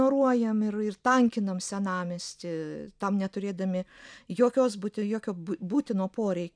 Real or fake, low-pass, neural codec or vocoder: real; 9.9 kHz; none